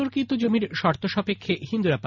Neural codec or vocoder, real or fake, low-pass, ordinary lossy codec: none; real; 7.2 kHz; none